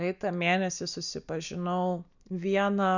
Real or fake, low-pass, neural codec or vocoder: real; 7.2 kHz; none